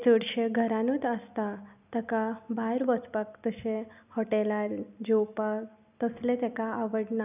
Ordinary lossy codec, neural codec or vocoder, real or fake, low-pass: none; none; real; 3.6 kHz